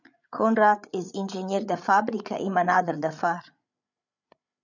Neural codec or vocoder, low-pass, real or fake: codec, 16 kHz, 8 kbps, FreqCodec, larger model; 7.2 kHz; fake